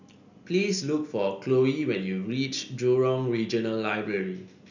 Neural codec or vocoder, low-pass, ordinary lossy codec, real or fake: none; 7.2 kHz; none; real